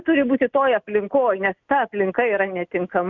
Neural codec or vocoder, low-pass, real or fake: none; 7.2 kHz; real